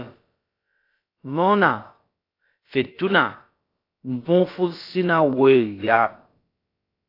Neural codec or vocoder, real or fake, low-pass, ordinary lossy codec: codec, 16 kHz, about 1 kbps, DyCAST, with the encoder's durations; fake; 5.4 kHz; AAC, 32 kbps